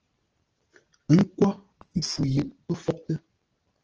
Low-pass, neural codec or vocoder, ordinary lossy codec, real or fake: 7.2 kHz; none; Opus, 16 kbps; real